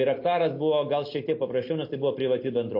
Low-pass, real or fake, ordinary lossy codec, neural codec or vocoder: 5.4 kHz; real; MP3, 32 kbps; none